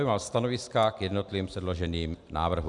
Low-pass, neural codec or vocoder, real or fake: 10.8 kHz; none; real